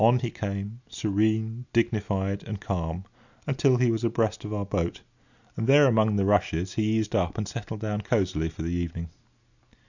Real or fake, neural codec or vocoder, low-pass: real; none; 7.2 kHz